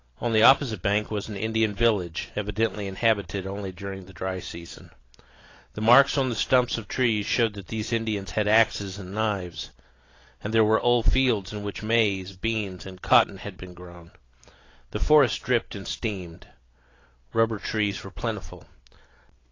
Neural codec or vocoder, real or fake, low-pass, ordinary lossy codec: none; real; 7.2 kHz; AAC, 32 kbps